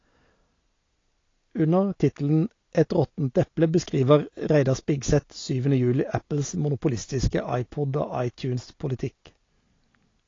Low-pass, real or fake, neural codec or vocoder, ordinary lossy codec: 7.2 kHz; real; none; AAC, 32 kbps